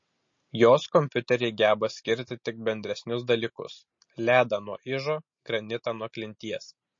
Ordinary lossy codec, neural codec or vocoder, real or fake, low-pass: MP3, 32 kbps; none; real; 7.2 kHz